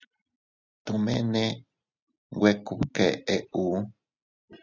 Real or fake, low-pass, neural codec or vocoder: real; 7.2 kHz; none